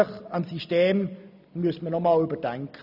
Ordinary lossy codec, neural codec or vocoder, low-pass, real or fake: none; none; 5.4 kHz; real